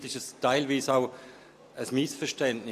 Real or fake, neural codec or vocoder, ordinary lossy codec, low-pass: real; none; AAC, 64 kbps; 14.4 kHz